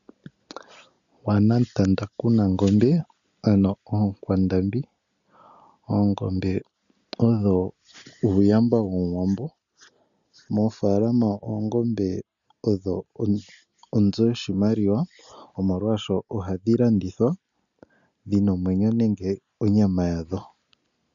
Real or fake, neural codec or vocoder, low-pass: real; none; 7.2 kHz